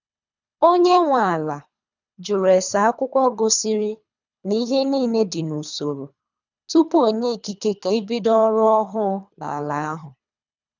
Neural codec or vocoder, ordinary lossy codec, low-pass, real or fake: codec, 24 kHz, 3 kbps, HILCodec; none; 7.2 kHz; fake